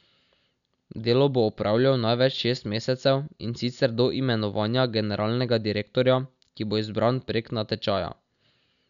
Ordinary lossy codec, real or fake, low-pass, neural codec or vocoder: none; real; 7.2 kHz; none